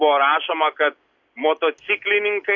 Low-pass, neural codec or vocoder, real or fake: 7.2 kHz; none; real